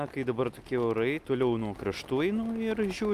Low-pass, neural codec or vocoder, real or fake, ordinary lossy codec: 14.4 kHz; none; real; Opus, 32 kbps